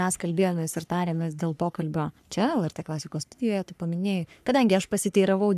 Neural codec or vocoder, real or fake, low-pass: codec, 44.1 kHz, 3.4 kbps, Pupu-Codec; fake; 14.4 kHz